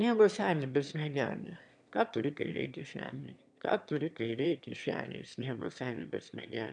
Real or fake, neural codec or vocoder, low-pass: fake; autoencoder, 22.05 kHz, a latent of 192 numbers a frame, VITS, trained on one speaker; 9.9 kHz